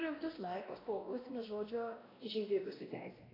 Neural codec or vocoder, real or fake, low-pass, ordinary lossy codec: codec, 16 kHz, 1 kbps, X-Codec, WavLM features, trained on Multilingual LibriSpeech; fake; 5.4 kHz; AAC, 24 kbps